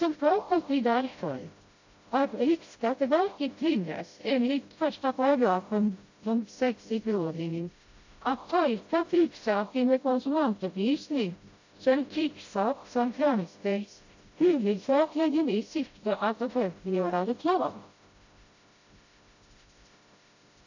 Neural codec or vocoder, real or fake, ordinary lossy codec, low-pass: codec, 16 kHz, 0.5 kbps, FreqCodec, smaller model; fake; AAC, 48 kbps; 7.2 kHz